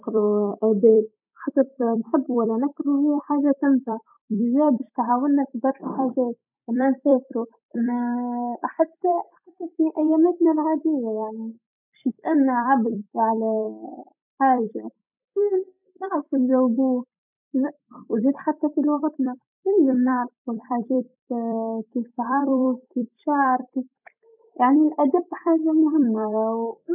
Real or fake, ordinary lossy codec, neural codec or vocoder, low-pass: fake; none; vocoder, 44.1 kHz, 128 mel bands every 256 samples, BigVGAN v2; 3.6 kHz